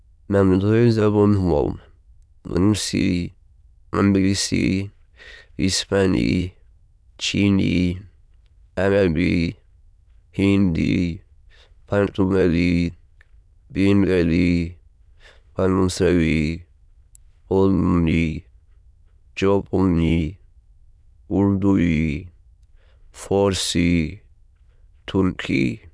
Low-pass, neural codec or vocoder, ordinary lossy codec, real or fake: none; autoencoder, 22.05 kHz, a latent of 192 numbers a frame, VITS, trained on many speakers; none; fake